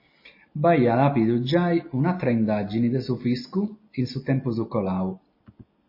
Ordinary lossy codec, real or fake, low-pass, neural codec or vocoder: MP3, 24 kbps; real; 5.4 kHz; none